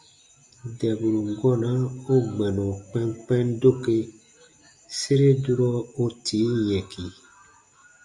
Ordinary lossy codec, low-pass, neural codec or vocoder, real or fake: Opus, 64 kbps; 10.8 kHz; none; real